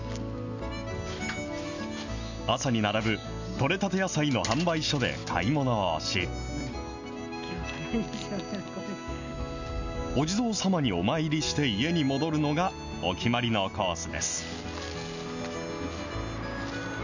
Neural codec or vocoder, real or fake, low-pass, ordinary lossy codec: none; real; 7.2 kHz; none